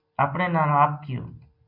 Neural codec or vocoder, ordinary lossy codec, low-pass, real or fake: none; MP3, 48 kbps; 5.4 kHz; real